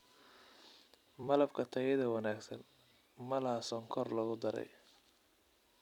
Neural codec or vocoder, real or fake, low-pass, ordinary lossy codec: vocoder, 44.1 kHz, 128 mel bands every 256 samples, BigVGAN v2; fake; 19.8 kHz; none